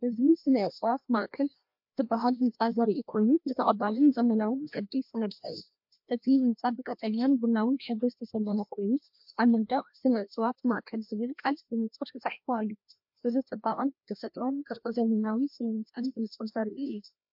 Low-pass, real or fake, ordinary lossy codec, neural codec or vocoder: 5.4 kHz; fake; MP3, 48 kbps; codec, 16 kHz, 1 kbps, FreqCodec, larger model